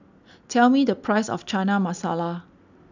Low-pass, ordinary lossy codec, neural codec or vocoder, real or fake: 7.2 kHz; none; none; real